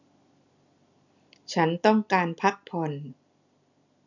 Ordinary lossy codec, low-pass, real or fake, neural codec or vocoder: none; 7.2 kHz; real; none